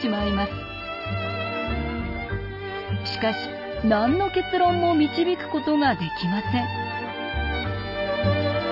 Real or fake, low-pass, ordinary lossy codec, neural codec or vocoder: real; 5.4 kHz; none; none